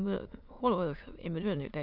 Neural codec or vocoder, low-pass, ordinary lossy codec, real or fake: autoencoder, 22.05 kHz, a latent of 192 numbers a frame, VITS, trained on many speakers; 5.4 kHz; none; fake